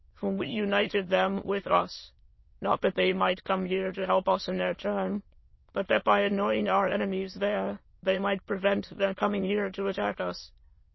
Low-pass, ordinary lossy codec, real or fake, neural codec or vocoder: 7.2 kHz; MP3, 24 kbps; fake; autoencoder, 22.05 kHz, a latent of 192 numbers a frame, VITS, trained on many speakers